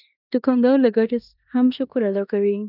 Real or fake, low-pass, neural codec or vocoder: fake; 5.4 kHz; codec, 16 kHz in and 24 kHz out, 0.9 kbps, LongCat-Audio-Codec, four codebook decoder